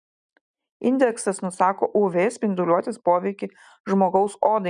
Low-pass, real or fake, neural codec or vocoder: 9.9 kHz; real; none